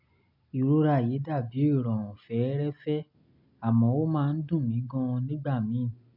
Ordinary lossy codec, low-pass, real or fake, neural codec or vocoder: MP3, 48 kbps; 5.4 kHz; real; none